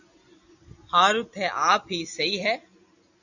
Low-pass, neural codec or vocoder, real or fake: 7.2 kHz; none; real